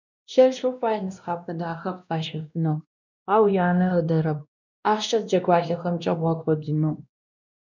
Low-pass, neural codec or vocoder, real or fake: 7.2 kHz; codec, 16 kHz, 1 kbps, X-Codec, WavLM features, trained on Multilingual LibriSpeech; fake